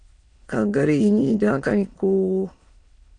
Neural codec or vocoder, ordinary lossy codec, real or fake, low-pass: autoencoder, 22.05 kHz, a latent of 192 numbers a frame, VITS, trained on many speakers; AAC, 48 kbps; fake; 9.9 kHz